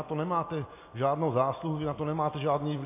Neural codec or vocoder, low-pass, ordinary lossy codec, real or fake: none; 3.6 kHz; MP3, 24 kbps; real